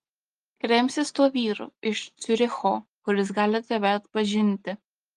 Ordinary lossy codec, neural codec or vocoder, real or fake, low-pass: Opus, 32 kbps; none; real; 10.8 kHz